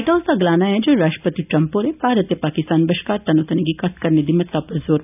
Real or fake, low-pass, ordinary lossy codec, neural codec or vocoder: real; 3.6 kHz; none; none